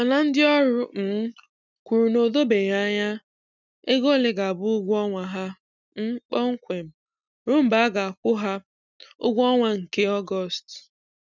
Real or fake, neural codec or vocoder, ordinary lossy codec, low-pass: real; none; none; 7.2 kHz